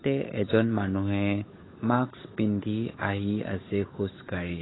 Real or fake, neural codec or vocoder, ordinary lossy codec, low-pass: fake; codec, 16 kHz, 8 kbps, FunCodec, trained on Chinese and English, 25 frames a second; AAC, 16 kbps; 7.2 kHz